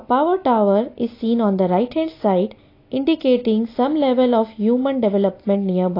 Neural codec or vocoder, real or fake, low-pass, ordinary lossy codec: none; real; 5.4 kHz; AAC, 32 kbps